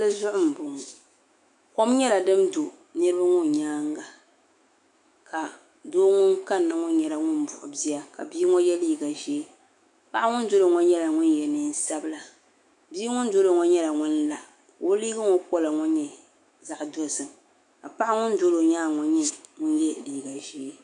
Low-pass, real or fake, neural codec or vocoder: 10.8 kHz; fake; autoencoder, 48 kHz, 128 numbers a frame, DAC-VAE, trained on Japanese speech